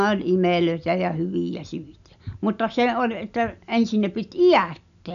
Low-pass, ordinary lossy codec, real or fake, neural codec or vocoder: 7.2 kHz; none; real; none